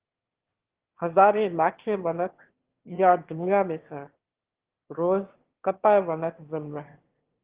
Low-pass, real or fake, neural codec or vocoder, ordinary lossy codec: 3.6 kHz; fake; autoencoder, 22.05 kHz, a latent of 192 numbers a frame, VITS, trained on one speaker; Opus, 16 kbps